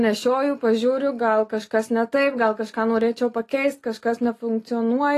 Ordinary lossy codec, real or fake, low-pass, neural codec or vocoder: AAC, 48 kbps; real; 14.4 kHz; none